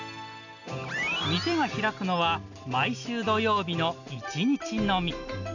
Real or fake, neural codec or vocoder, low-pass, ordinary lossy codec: real; none; 7.2 kHz; none